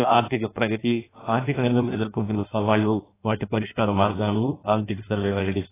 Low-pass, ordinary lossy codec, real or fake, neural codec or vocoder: 3.6 kHz; AAC, 16 kbps; fake; codec, 16 kHz in and 24 kHz out, 0.6 kbps, FireRedTTS-2 codec